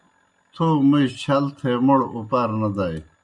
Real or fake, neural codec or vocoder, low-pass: real; none; 10.8 kHz